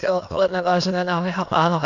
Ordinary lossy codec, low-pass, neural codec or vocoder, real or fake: MP3, 64 kbps; 7.2 kHz; autoencoder, 22.05 kHz, a latent of 192 numbers a frame, VITS, trained on many speakers; fake